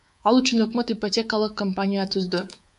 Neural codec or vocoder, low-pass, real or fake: codec, 24 kHz, 3.1 kbps, DualCodec; 10.8 kHz; fake